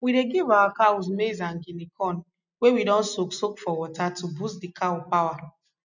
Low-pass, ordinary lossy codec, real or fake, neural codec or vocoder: 7.2 kHz; none; real; none